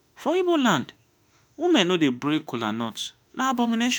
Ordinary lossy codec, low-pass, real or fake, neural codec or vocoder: none; none; fake; autoencoder, 48 kHz, 32 numbers a frame, DAC-VAE, trained on Japanese speech